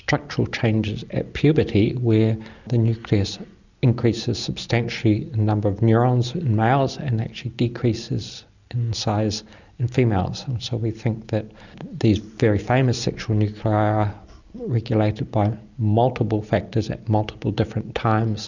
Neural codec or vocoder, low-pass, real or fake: none; 7.2 kHz; real